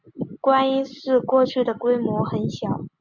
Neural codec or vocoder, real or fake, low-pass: none; real; 7.2 kHz